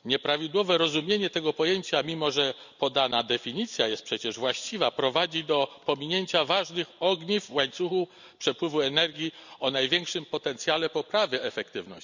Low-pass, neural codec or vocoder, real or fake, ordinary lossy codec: 7.2 kHz; none; real; none